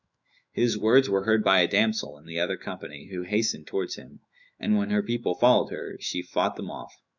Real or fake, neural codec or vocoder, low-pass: fake; autoencoder, 48 kHz, 128 numbers a frame, DAC-VAE, trained on Japanese speech; 7.2 kHz